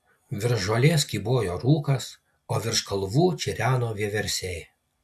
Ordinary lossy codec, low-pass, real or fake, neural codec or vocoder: AAC, 96 kbps; 14.4 kHz; real; none